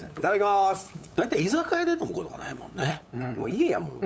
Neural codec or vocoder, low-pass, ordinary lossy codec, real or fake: codec, 16 kHz, 8 kbps, FunCodec, trained on LibriTTS, 25 frames a second; none; none; fake